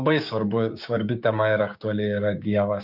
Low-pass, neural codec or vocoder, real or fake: 5.4 kHz; codec, 16 kHz, 6 kbps, DAC; fake